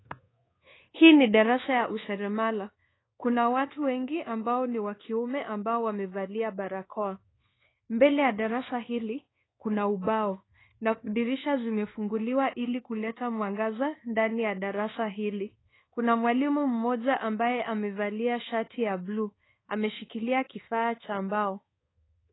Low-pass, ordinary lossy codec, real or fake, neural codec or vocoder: 7.2 kHz; AAC, 16 kbps; fake; codec, 24 kHz, 1.2 kbps, DualCodec